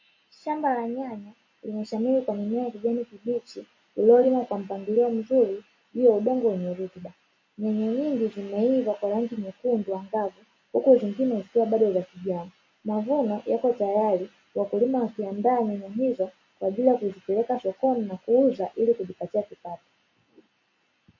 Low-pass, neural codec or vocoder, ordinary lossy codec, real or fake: 7.2 kHz; none; MP3, 32 kbps; real